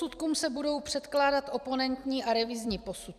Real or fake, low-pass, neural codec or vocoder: fake; 14.4 kHz; vocoder, 44.1 kHz, 128 mel bands every 256 samples, BigVGAN v2